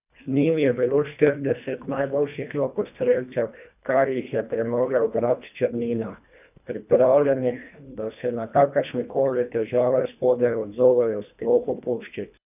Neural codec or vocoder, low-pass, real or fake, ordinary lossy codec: codec, 24 kHz, 1.5 kbps, HILCodec; 3.6 kHz; fake; none